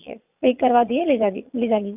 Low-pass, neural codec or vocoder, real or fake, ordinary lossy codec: 3.6 kHz; vocoder, 22.05 kHz, 80 mel bands, Vocos; fake; none